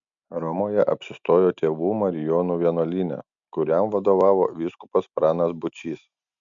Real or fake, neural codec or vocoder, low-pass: real; none; 7.2 kHz